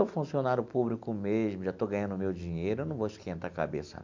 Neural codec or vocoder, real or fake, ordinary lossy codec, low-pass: none; real; MP3, 64 kbps; 7.2 kHz